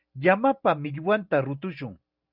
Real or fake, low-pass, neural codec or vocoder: real; 5.4 kHz; none